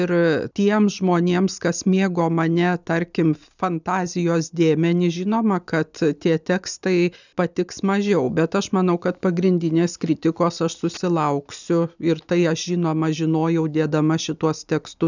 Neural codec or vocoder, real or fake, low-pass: none; real; 7.2 kHz